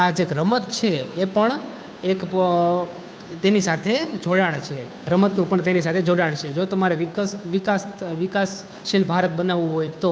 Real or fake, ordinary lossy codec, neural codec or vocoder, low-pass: fake; none; codec, 16 kHz, 2 kbps, FunCodec, trained on Chinese and English, 25 frames a second; none